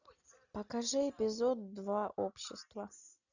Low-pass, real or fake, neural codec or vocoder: 7.2 kHz; real; none